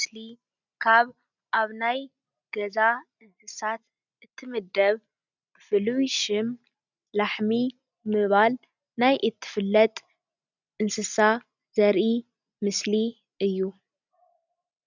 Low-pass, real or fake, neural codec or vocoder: 7.2 kHz; real; none